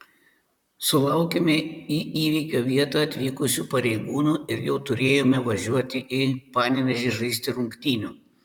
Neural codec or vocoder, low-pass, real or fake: vocoder, 44.1 kHz, 128 mel bands, Pupu-Vocoder; 19.8 kHz; fake